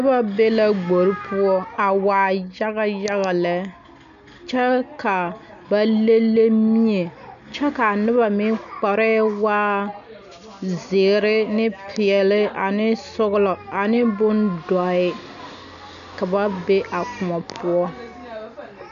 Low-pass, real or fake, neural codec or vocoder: 7.2 kHz; real; none